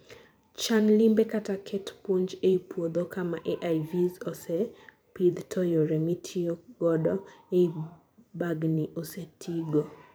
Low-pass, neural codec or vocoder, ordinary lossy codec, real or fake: none; vocoder, 44.1 kHz, 128 mel bands every 512 samples, BigVGAN v2; none; fake